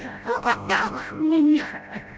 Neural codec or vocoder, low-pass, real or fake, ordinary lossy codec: codec, 16 kHz, 0.5 kbps, FreqCodec, smaller model; none; fake; none